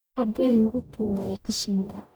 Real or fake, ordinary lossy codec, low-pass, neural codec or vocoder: fake; none; none; codec, 44.1 kHz, 0.9 kbps, DAC